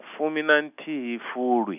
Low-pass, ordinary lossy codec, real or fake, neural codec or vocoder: 3.6 kHz; none; real; none